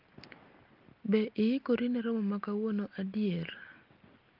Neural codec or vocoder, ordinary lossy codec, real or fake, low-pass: none; Opus, 32 kbps; real; 5.4 kHz